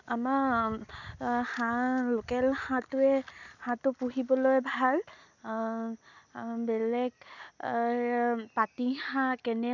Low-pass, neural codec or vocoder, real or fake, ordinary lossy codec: 7.2 kHz; none; real; none